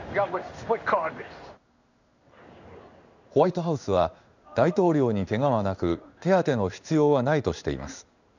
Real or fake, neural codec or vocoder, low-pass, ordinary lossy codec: fake; codec, 16 kHz in and 24 kHz out, 1 kbps, XY-Tokenizer; 7.2 kHz; none